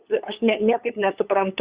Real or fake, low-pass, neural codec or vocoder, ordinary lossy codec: fake; 3.6 kHz; codec, 16 kHz, 8 kbps, FunCodec, trained on LibriTTS, 25 frames a second; Opus, 64 kbps